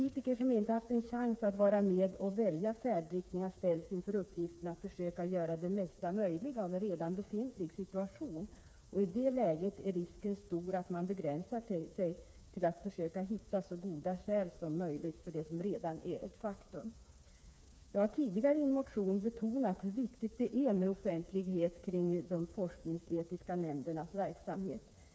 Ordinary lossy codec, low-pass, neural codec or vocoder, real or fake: none; none; codec, 16 kHz, 4 kbps, FreqCodec, smaller model; fake